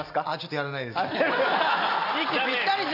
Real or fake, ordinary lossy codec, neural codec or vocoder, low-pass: fake; AAC, 48 kbps; vocoder, 44.1 kHz, 128 mel bands every 256 samples, BigVGAN v2; 5.4 kHz